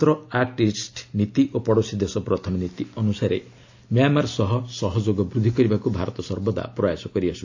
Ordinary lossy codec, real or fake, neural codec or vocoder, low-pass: AAC, 48 kbps; real; none; 7.2 kHz